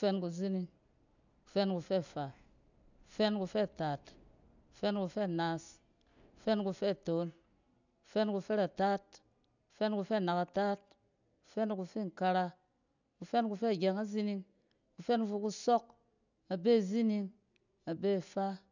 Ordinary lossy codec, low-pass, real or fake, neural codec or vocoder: none; 7.2 kHz; real; none